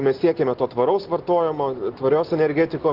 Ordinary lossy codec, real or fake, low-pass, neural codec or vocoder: Opus, 16 kbps; real; 5.4 kHz; none